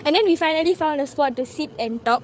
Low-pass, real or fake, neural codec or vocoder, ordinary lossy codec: none; fake; codec, 16 kHz, 8 kbps, FreqCodec, larger model; none